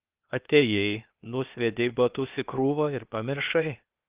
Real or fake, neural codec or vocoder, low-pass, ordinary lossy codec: fake; codec, 16 kHz, 0.8 kbps, ZipCodec; 3.6 kHz; Opus, 32 kbps